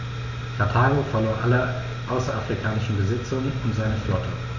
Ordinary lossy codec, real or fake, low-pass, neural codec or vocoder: none; real; 7.2 kHz; none